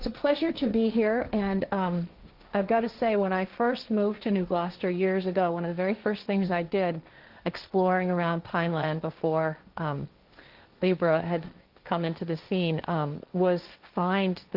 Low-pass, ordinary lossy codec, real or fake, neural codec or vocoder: 5.4 kHz; Opus, 32 kbps; fake; codec, 16 kHz, 1.1 kbps, Voila-Tokenizer